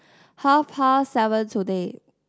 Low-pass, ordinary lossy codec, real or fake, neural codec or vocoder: none; none; real; none